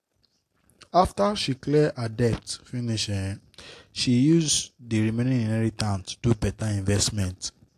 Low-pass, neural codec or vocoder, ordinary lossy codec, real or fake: 14.4 kHz; none; AAC, 64 kbps; real